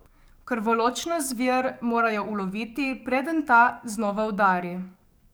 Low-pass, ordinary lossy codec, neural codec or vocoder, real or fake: none; none; codec, 44.1 kHz, 7.8 kbps, DAC; fake